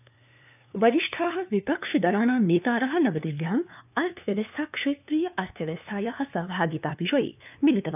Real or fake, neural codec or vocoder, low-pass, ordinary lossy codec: fake; codec, 16 kHz, 2 kbps, FunCodec, trained on LibriTTS, 25 frames a second; 3.6 kHz; none